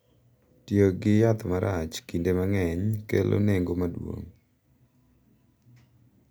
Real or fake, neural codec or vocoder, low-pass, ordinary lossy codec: real; none; none; none